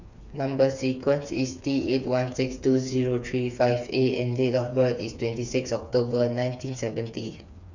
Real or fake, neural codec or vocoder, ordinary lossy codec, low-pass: fake; codec, 16 kHz, 4 kbps, FreqCodec, smaller model; none; 7.2 kHz